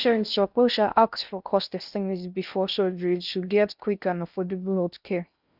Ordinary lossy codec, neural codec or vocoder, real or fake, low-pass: none; codec, 16 kHz in and 24 kHz out, 0.6 kbps, FocalCodec, streaming, 4096 codes; fake; 5.4 kHz